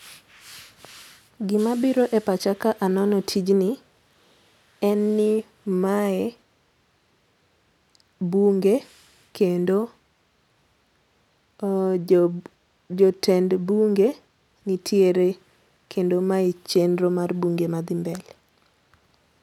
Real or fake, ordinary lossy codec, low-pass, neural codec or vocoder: real; none; 19.8 kHz; none